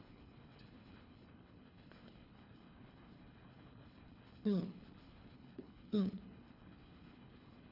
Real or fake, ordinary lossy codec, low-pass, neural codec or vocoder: fake; none; 5.4 kHz; codec, 24 kHz, 3 kbps, HILCodec